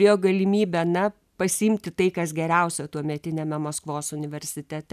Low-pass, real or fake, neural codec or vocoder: 14.4 kHz; real; none